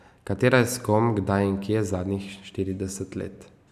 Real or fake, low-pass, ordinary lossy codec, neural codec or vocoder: real; 14.4 kHz; none; none